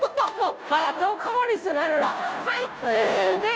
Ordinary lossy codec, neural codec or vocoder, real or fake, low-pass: none; codec, 16 kHz, 0.5 kbps, FunCodec, trained on Chinese and English, 25 frames a second; fake; none